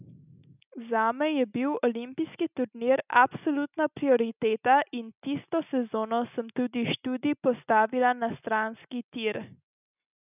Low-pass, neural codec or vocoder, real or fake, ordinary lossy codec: 3.6 kHz; none; real; none